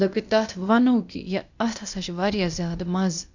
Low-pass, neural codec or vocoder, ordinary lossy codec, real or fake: 7.2 kHz; codec, 16 kHz, 0.8 kbps, ZipCodec; none; fake